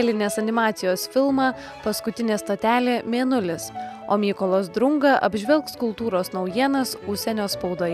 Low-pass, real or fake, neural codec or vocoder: 14.4 kHz; real; none